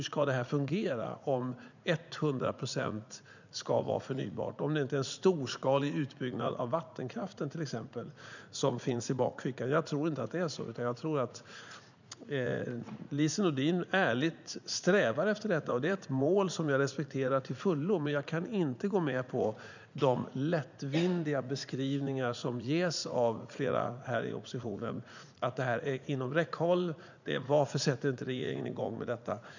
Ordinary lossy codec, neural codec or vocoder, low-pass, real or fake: none; vocoder, 44.1 kHz, 80 mel bands, Vocos; 7.2 kHz; fake